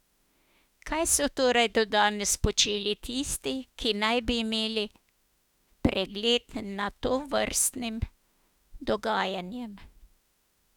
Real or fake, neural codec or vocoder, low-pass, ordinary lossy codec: fake; autoencoder, 48 kHz, 32 numbers a frame, DAC-VAE, trained on Japanese speech; 19.8 kHz; none